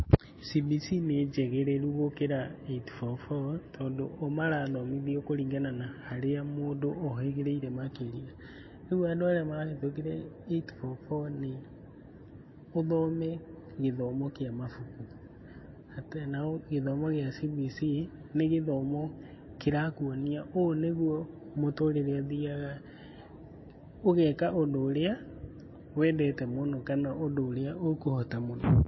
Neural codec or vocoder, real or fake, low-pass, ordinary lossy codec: none; real; 7.2 kHz; MP3, 24 kbps